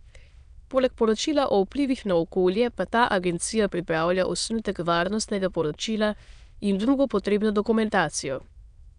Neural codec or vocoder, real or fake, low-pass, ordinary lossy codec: autoencoder, 22.05 kHz, a latent of 192 numbers a frame, VITS, trained on many speakers; fake; 9.9 kHz; none